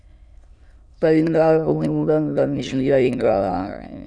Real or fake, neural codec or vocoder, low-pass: fake; autoencoder, 22.05 kHz, a latent of 192 numbers a frame, VITS, trained on many speakers; 9.9 kHz